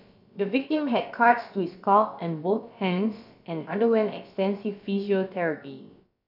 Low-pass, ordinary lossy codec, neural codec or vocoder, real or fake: 5.4 kHz; AAC, 48 kbps; codec, 16 kHz, about 1 kbps, DyCAST, with the encoder's durations; fake